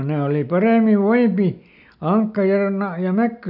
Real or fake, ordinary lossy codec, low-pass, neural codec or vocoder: real; none; 5.4 kHz; none